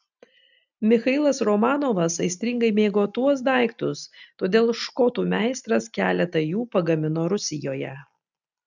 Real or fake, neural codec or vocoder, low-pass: real; none; 7.2 kHz